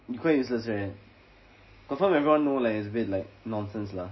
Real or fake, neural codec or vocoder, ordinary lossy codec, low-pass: real; none; MP3, 24 kbps; 7.2 kHz